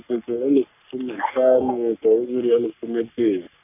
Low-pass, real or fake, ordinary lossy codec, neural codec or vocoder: 3.6 kHz; fake; none; codec, 44.1 kHz, 3.4 kbps, Pupu-Codec